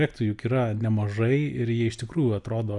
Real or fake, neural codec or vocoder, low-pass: real; none; 10.8 kHz